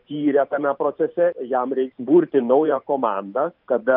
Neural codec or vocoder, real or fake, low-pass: vocoder, 44.1 kHz, 128 mel bands every 512 samples, BigVGAN v2; fake; 5.4 kHz